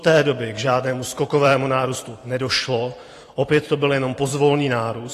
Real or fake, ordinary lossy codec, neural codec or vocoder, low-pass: fake; AAC, 48 kbps; vocoder, 44.1 kHz, 128 mel bands every 512 samples, BigVGAN v2; 14.4 kHz